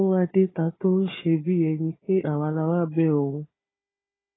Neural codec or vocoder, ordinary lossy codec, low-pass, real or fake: codec, 16 kHz, 4 kbps, FunCodec, trained on Chinese and English, 50 frames a second; AAC, 16 kbps; 7.2 kHz; fake